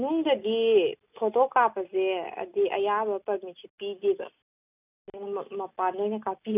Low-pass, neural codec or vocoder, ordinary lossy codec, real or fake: 3.6 kHz; none; none; real